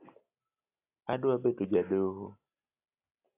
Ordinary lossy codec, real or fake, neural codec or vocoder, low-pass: AAC, 24 kbps; real; none; 3.6 kHz